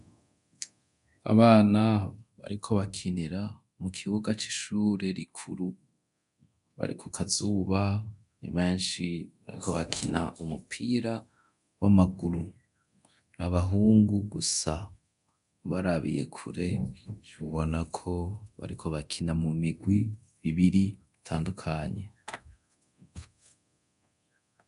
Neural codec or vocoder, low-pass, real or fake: codec, 24 kHz, 0.9 kbps, DualCodec; 10.8 kHz; fake